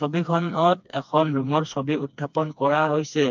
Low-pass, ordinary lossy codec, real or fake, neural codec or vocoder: 7.2 kHz; MP3, 64 kbps; fake; codec, 16 kHz, 2 kbps, FreqCodec, smaller model